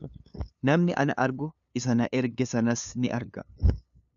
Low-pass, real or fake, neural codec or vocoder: 7.2 kHz; fake; codec, 16 kHz, 2 kbps, FunCodec, trained on LibriTTS, 25 frames a second